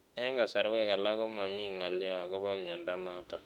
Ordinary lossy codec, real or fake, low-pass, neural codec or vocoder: none; fake; 19.8 kHz; autoencoder, 48 kHz, 32 numbers a frame, DAC-VAE, trained on Japanese speech